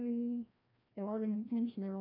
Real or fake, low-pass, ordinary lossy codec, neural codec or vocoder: fake; 5.4 kHz; none; codec, 16 kHz, 1 kbps, FreqCodec, larger model